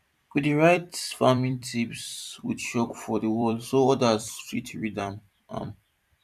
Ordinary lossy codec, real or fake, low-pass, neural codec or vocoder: none; fake; 14.4 kHz; vocoder, 44.1 kHz, 128 mel bands every 256 samples, BigVGAN v2